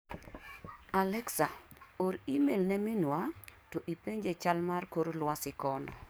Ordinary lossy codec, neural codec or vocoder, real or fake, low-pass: none; codec, 44.1 kHz, 7.8 kbps, DAC; fake; none